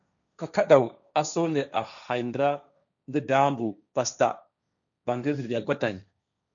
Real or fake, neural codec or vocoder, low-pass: fake; codec, 16 kHz, 1.1 kbps, Voila-Tokenizer; 7.2 kHz